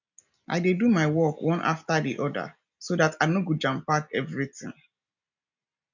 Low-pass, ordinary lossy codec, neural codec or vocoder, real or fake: 7.2 kHz; none; none; real